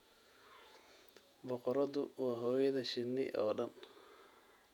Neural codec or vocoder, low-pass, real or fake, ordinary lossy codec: vocoder, 48 kHz, 128 mel bands, Vocos; 19.8 kHz; fake; none